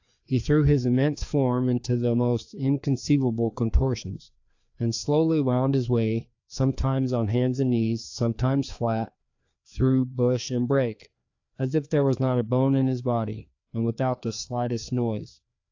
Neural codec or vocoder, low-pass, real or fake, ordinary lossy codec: codec, 16 kHz, 2 kbps, FreqCodec, larger model; 7.2 kHz; fake; AAC, 48 kbps